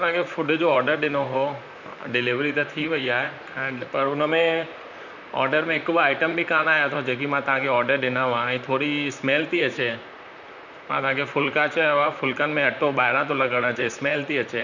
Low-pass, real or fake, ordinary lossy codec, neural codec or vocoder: 7.2 kHz; fake; none; vocoder, 44.1 kHz, 128 mel bands, Pupu-Vocoder